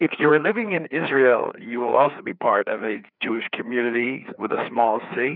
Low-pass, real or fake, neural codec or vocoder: 5.4 kHz; fake; codec, 16 kHz, 2 kbps, FreqCodec, larger model